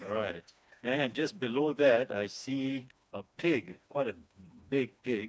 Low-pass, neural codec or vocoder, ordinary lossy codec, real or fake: none; codec, 16 kHz, 2 kbps, FreqCodec, smaller model; none; fake